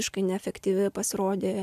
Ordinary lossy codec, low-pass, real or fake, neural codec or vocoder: MP3, 96 kbps; 14.4 kHz; real; none